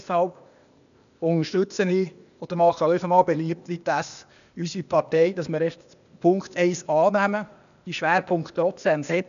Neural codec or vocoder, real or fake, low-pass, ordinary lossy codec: codec, 16 kHz, 0.8 kbps, ZipCodec; fake; 7.2 kHz; none